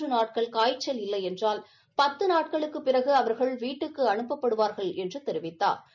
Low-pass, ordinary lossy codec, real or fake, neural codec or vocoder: 7.2 kHz; none; real; none